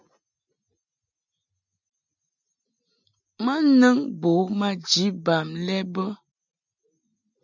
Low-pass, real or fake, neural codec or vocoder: 7.2 kHz; real; none